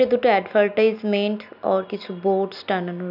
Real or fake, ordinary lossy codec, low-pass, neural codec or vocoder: real; none; 5.4 kHz; none